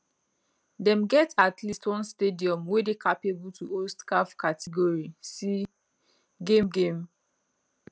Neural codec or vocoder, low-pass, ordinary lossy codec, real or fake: none; none; none; real